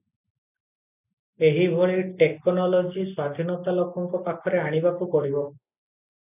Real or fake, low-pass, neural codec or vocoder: real; 3.6 kHz; none